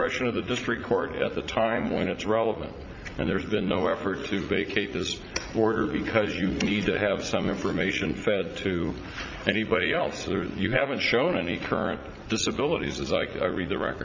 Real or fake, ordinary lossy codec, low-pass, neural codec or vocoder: fake; MP3, 64 kbps; 7.2 kHz; vocoder, 44.1 kHz, 80 mel bands, Vocos